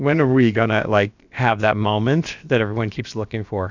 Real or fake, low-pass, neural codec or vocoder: fake; 7.2 kHz; codec, 16 kHz, about 1 kbps, DyCAST, with the encoder's durations